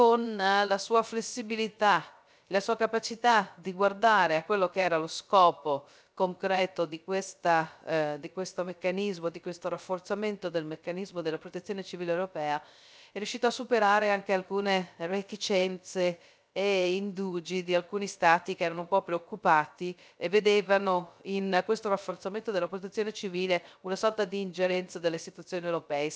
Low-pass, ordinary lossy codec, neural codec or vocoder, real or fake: none; none; codec, 16 kHz, 0.3 kbps, FocalCodec; fake